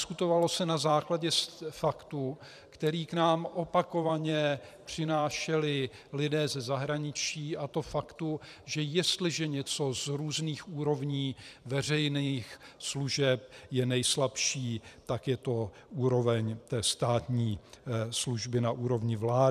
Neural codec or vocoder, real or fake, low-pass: vocoder, 44.1 kHz, 128 mel bands every 512 samples, BigVGAN v2; fake; 14.4 kHz